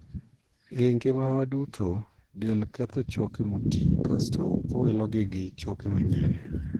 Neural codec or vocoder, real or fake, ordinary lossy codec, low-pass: codec, 44.1 kHz, 2.6 kbps, DAC; fake; Opus, 16 kbps; 19.8 kHz